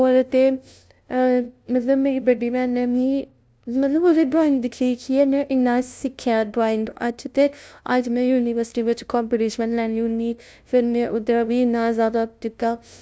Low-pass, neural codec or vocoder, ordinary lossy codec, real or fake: none; codec, 16 kHz, 0.5 kbps, FunCodec, trained on LibriTTS, 25 frames a second; none; fake